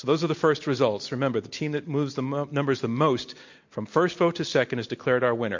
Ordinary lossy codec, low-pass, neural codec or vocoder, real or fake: MP3, 48 kbps; 7.2 kHz; none; real